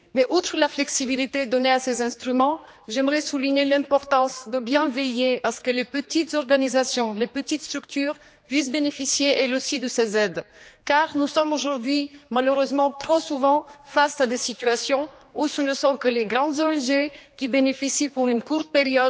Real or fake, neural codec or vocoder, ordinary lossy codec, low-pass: fake; codec, 16 kHz, 2 kbps, X-Codec, HuBERT features, trained on general audio; none; none